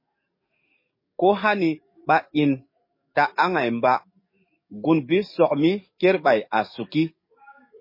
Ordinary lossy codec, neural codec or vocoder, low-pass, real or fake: MP3, 24 kbps; codec, 44.1 kHz, 7.8 kbps, DAC; 5.4 kHz; fake